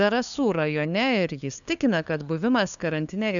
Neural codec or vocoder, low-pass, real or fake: codec, 16 kHz, 2 kbps, FunCodec, trained on LibriTTS, 25 frames a second; 7.2 kHz; fake